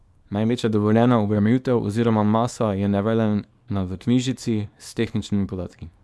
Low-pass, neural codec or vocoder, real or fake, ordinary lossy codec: none; codec, 24 kHz, 0.9 kbps, WavTokenizer, small release; fake; none